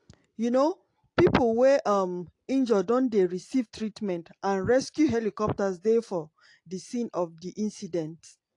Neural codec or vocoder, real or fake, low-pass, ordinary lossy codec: none; real; 10.8 kHz; AAC, 48 kbps